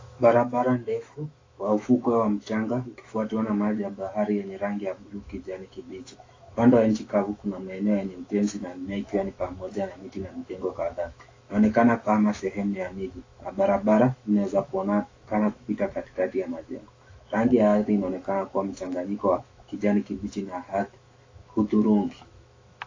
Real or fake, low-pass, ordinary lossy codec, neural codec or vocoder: fake; 7.2 kHz; AAC, 32 kbps; autoencoder, 48 kHz, 128 numbers a frame, DAC-VAE, trained on Japanese speech